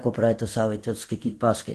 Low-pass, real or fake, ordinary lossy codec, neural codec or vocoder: 10.8 kHz; fake; Opus, 16 kbps; codec, 24 kHz, 0.9 kbps, DualCodec